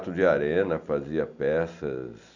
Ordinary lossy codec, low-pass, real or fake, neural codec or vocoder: none; 7.2 kHz; real; none